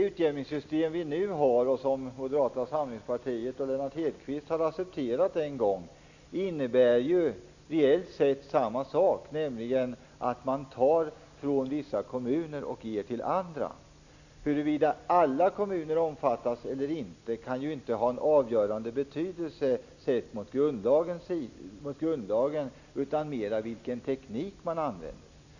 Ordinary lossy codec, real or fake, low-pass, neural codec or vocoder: none; real; 7.2 kHz; none